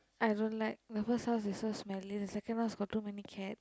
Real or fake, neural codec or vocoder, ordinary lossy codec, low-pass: real; none; none; none